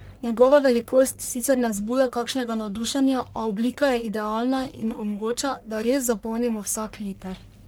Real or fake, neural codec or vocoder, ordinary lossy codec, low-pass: fake; codec, 44.1 kHz, 1.7 kbps, Pupu-Codec; none; none